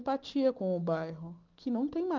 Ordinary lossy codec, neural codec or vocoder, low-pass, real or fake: Opus, 24 kbps; none; 7.2 kHz; real